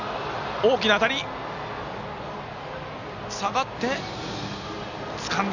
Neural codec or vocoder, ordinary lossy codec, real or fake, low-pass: none; none; real; 7.2 kHz